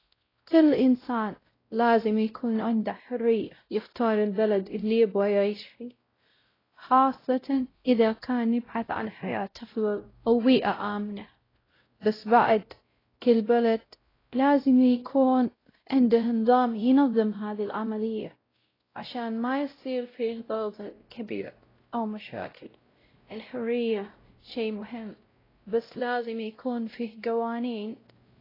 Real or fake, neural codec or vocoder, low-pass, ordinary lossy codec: fake; codec, 16 kHz, 0.5 kbps, X-Codec, WavLM features, trained on Multilingual LibriSpeech; 5.4 kHz; AAC, 24 kbps